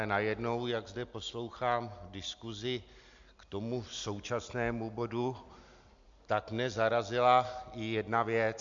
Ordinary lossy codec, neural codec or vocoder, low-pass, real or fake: MP3, 64 kbps; none; 7.2 kHz; real